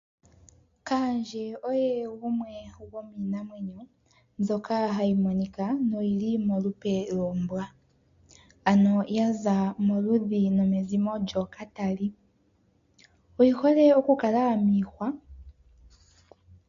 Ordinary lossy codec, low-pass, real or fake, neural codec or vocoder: MP3, 48 kbps; 7.2 kHz; real; none